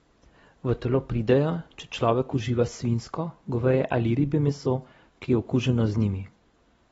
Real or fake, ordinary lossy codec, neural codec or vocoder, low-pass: real; AAC, 24 kbps; none; 19.8 kHz